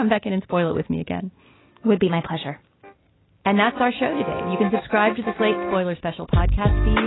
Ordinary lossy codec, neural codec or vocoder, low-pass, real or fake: AAC, 16 kbps; none; 7.2 kHz; real